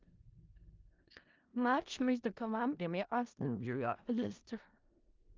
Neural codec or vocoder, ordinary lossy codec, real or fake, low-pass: codec, 16 kHz in and 24 kHz out, 0.4 kbps, LongCat-Audio-Codec, four codebook decoder; Opus, 32 kbps; fake; 7.2 kHz